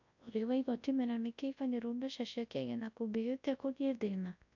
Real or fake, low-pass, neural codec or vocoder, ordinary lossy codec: fake; 7.2 kHz; codec, 24 kHz, 0.9 kbps, WavTokenizer, large speech release; AAC, 48 kbps